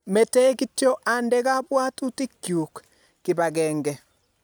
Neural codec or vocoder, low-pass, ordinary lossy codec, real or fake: none; none; none; real